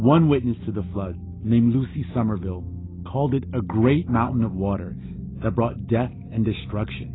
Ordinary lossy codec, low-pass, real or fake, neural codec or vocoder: AAC, 16 kbps; 7.2 kHz; real; none